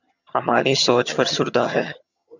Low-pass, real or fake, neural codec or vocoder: 7.2 kHz; fake; vocoder, 22.05 kHz, 80 mel bands, HiFi-GAN